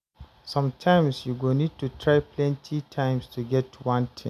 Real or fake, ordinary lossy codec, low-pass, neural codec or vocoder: real; none; 14.4 kHz; none